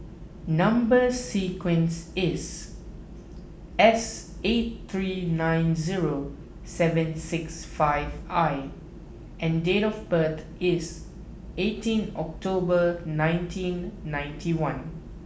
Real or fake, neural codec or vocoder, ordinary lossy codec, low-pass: real; none; none; none